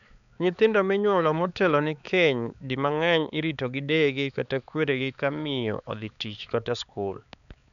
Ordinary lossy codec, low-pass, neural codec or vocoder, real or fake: none; 7.2 kHz; codec, 16 kHz, 4 kbps, X-Codec, HuBERT features, trained on balanced general audio; fake